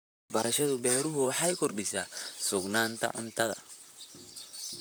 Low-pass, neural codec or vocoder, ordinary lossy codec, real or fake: none; vocoder, 44.1 kHz, 128 mel bands, Pupu-Vocoder; none; fake